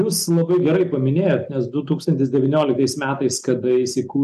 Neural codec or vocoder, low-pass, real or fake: none; 14.4 kHz; real